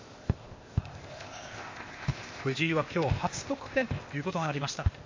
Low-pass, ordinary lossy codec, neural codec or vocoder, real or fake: 7.2 kHz; MP3, 32 kbps; codec, 16 kHz, 0.8 kbps, ZipCodec; fake